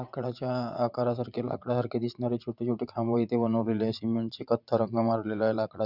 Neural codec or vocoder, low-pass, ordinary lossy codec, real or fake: vocoder, 44.1 kHz, 80 mel bands, Vocos; 5.4 kHz; none; fake